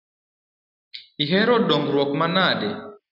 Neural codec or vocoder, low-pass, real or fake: none; 5.4 kHz; real